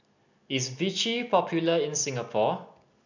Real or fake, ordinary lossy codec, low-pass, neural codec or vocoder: real; none; 7.2 kHz; none